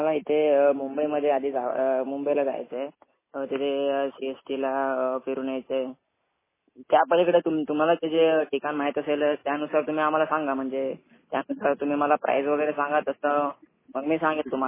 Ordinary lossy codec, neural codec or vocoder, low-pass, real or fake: MP3, 16 kbps; none; 3.6 kHz; real